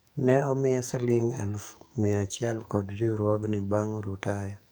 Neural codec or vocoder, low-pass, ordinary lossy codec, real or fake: codec, 44.1 kHz, 2.6 kbps, SNAC; none; none; fake